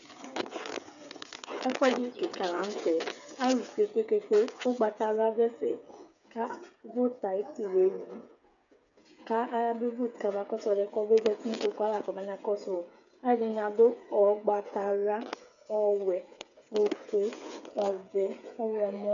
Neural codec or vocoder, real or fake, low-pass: codec, 16 kHz, 8 kbps, FreqCodec, smaller model; fake; 7.2 kHz